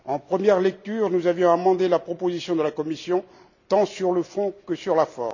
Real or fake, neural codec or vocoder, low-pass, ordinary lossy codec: real; none; 7.2 kHz; none